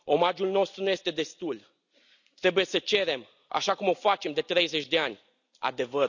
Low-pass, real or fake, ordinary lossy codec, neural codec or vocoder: 7.2 kHz; real; none; none